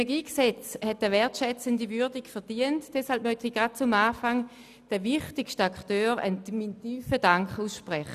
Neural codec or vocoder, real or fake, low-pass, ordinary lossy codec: none; real; 14.4 kHz; none